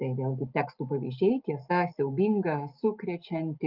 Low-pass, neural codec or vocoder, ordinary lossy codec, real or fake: 5.4 kHz; none; Opus, 24 kbps; real